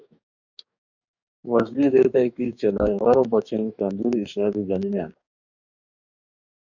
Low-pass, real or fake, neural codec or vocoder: 7.2 kHz; fake; codec, 44.1 kHz, 2.6 kbps, DAC